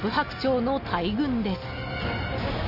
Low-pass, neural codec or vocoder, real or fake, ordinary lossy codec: 5.4 kHz; none; real; none